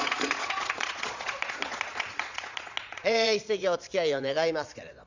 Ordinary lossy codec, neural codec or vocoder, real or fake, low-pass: Opus, 64 kbps; vocoder, 22.05 kHz, 80 mel bands, Vocos; fake; 7.2 kHz